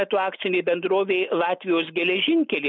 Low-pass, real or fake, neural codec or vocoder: 7.2 kHz; real; none